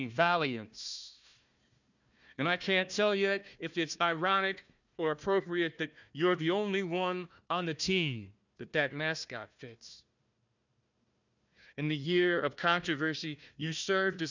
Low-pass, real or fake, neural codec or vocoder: 7.2 kHz; fake; codec, 16 kHz, 1 kbps, FunCodec, trained on Chinese and English, 50 frames a second